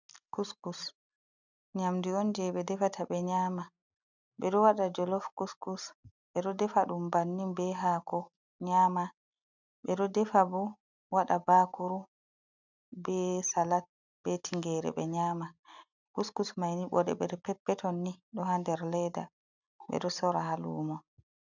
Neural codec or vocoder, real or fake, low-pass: none; real; 7.2 kHz